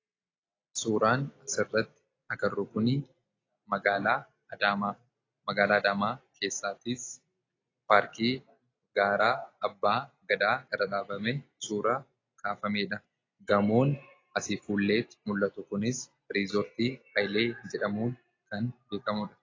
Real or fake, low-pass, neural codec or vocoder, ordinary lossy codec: real; 7.2 kHz; none; AAC, 32 kbps